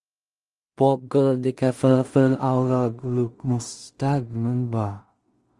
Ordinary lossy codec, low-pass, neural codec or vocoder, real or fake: Opus, 24 kbps; 10.8 kHz; codec, 16 kHz in and 24 kHz out, 0.4 kbps, LongCat-Audio-Codec, two codebook decoder; fake